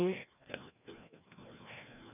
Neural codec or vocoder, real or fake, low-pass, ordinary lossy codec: codec, 16 kHz, 1 kbps, FreqCodec, larger model; fake; 3.6 kHz; none